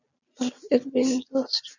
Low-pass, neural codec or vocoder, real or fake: 7.2 kHz; none; real